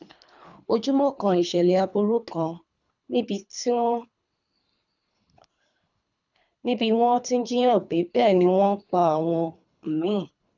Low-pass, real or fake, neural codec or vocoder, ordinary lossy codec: 7.2 kHz; fake; codec, 24 kHz, 3 kbps, HILCodec; none